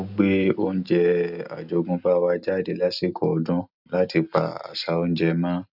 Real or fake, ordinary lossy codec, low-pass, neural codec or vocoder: real; none; 5.4 kHz; none